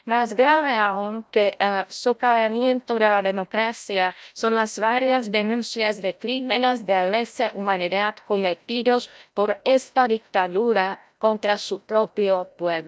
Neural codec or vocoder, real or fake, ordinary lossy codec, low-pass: codec, 16 kHz, 0.5 kbps, FreqCodec, larger model; fake; none; none